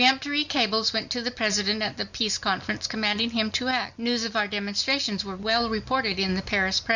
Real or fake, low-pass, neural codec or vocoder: real; 7.2 kHz; none